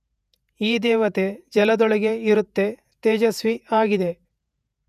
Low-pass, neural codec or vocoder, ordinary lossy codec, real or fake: 14.4 kHz; vocoder, 48 kHz, 128 mel bands, Vocos; none; fake